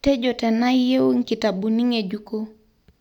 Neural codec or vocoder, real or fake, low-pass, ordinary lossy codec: none; real; 19.8 kHz; none